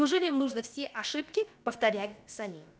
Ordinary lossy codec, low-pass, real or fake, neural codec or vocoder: none; none; fake; codec, 16 kHz, about 1 kbps, DyCAST, with the encoder's durations